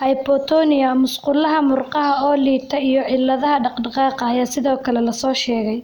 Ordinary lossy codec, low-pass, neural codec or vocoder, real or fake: none; 19.8 kHz; none; real